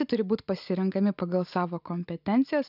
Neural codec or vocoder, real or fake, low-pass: none; real; 5.4 kHz